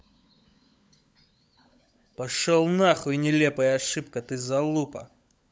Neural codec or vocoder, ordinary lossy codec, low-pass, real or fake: codec, 16 kHz, 16 kbps, FunCodec, trained on LibriTTS, 50 frames a second; none; none; fake